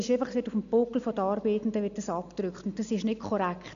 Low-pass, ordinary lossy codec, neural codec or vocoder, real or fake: 7.2 kHz; MP3, 96 kbps; none; real